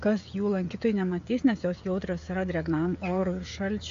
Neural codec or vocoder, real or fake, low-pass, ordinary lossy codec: codec, 16 kHz, 16 kbps, FreqCodec, smaller model; fake; 7.2 kHz; AAC, 48 kbps